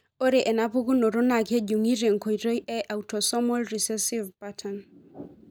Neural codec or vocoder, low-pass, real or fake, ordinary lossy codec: none; none; real; none